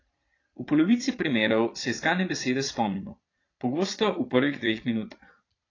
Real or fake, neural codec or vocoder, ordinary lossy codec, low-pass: fake; vocoder, 22.05 kHz, 80 mel bands, Vocos; AAC, 32 kbps; 7.2 kHz